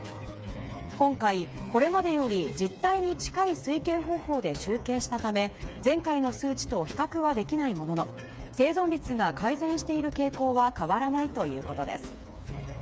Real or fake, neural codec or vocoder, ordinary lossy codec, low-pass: fake; codec, 16 kHz, 4 kbps, FreqCodec, smaller model; none; none